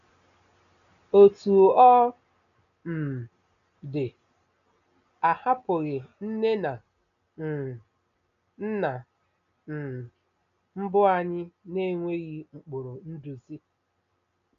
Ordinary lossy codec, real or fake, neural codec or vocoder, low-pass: none; real; none; 7.2 kHz